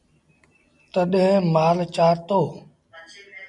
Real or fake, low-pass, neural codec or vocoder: real; 10.8 kHz; none